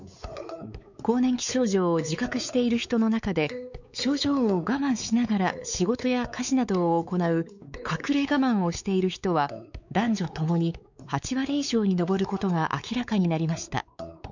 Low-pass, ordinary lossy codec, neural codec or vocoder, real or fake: 7.2 kHz; none; codec, 16 kHz, 4 kbps, X-Codec, WavLM features, trained on Multilingual LibriSpeech; fake